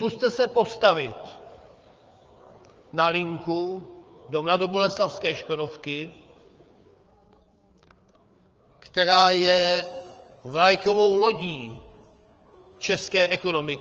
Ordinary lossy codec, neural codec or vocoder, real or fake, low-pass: Opus, 24 kbps; codec, 16 kHz, 4 kbps, FreqCodec, larger model; fake; 7.2 kHz